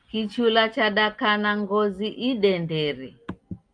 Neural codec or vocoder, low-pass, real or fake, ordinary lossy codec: none; 9.9 kHz; real; Opus, 32 kbps